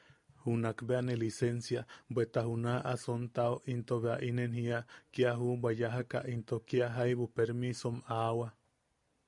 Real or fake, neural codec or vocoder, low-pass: real; none; 10.8 kHz